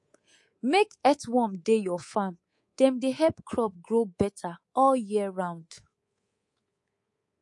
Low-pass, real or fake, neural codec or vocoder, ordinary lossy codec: 10.8 kHz; fake; codec, 24 kHz, 3.1 kbps, DualCodec; MP3, 48 kbps